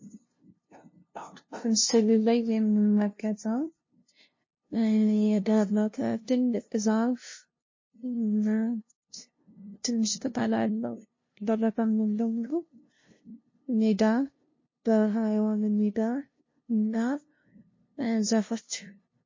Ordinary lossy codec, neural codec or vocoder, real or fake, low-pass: MP3, 32 kbps; codec, 16 kHz, 0.5 kbps, FunCodec, trained on LibriTTS, 25 frames a second; fake; 7.2 kHz